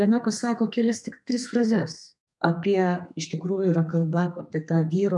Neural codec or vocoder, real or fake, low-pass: codec, 32 kHz, 1.9 kbps, SNAC; fake; 10.8 kHz